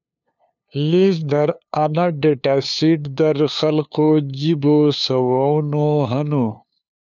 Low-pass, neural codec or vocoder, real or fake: 7.2 kHz; codec, 16 kHz, 2 kbps, FunCodec, trained on LibriTTS, 25 frames a second; fake